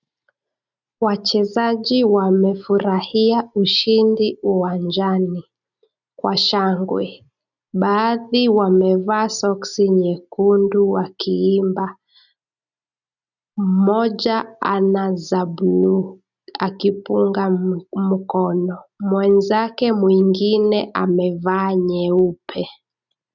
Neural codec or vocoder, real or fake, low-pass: none; real; 7.2 kHz